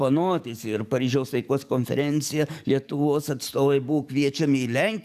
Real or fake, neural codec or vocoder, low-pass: fake; codec, 44.1 kHz, 7.8 kbps, DAC; 14.4 kHz